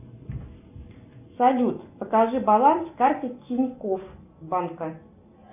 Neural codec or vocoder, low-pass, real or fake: none; 3.6 kHz; real